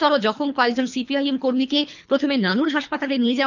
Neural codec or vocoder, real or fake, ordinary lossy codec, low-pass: codec, 24 kHz, 3 kbps, HILCodec; fake; none; 7.2 kHz